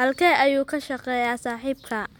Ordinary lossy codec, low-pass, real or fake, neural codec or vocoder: MP3, 96 kbps; 19.8 kHz; real; none